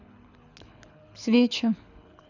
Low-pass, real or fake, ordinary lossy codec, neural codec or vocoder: 7.2 kHz; fake; none; codec, 24 kHz, 6 kbps, HILCodec